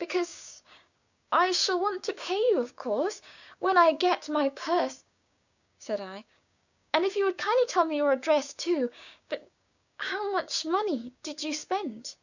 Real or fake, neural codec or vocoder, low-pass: fake; codec, 16 kHz, 6 kbps, DAC; 7.2 kHz